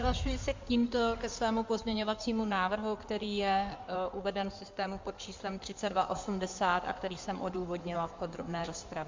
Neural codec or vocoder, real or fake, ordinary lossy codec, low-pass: codec, 16 kHz in and 24 kHz out, 2.2 kbps, FireRedTTS-2 codec; fake; MP3, 64 kbps; 7.2 kHz